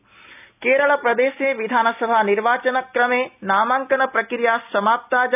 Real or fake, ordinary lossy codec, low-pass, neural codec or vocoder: real; none; 3.6 kHz; none